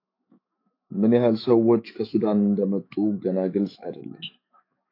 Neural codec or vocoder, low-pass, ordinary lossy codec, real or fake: autoencoder, 48 kHz, 128 numbers a frame, DAC-VAE, trained on Japanese speech; 5.4 kHz; AAC, 32 kbps; fake